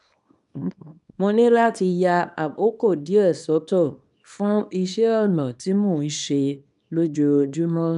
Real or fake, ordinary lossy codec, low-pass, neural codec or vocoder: fake; none; 10.8 kHz; codec, 24 kHz, 0.9 kbps, WavTokenizer, small release